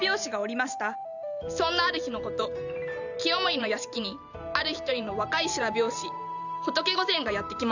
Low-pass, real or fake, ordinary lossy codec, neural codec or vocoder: 7.2 kHz; fake; none; vocoder, 44.1 kHz, 128 mel bands every 512 samples, BigVGAN v2